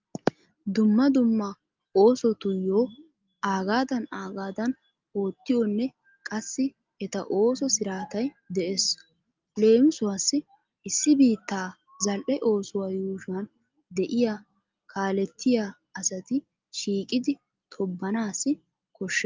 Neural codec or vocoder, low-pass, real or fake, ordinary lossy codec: none; 7.2 kHz; real; Opus, 24 kbps